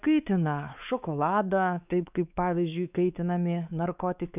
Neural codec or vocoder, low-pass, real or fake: codec, 16 kHz, 4 kbps, X-Codec, WavLM features, trained on Multilingual LibriSpeech; 3.6 kHz; fake